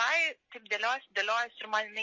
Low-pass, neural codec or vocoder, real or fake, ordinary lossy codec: 7.2 kHz; none; real; MP3, 32 kbps